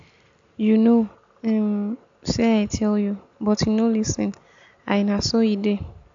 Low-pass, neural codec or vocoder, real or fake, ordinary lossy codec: 7.2 kHz; none; real; AAC, 64 kbps